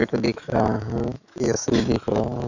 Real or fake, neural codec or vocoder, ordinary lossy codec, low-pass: fake; autoencoder, 48 kHz, 128 numbers a frame, DAC-VAE, trained on Japanese speech; none; 7.2 kHz